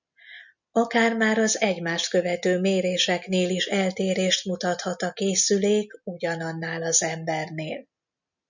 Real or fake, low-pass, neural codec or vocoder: real; 7.2 kHz; none